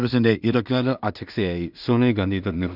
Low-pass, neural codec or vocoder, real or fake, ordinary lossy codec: 5.4 kHz; codec, 16 kHz in and 24 kHz out, 0.4 kbps, LongCat-Audio-Codec, two codebook decoder; fake; none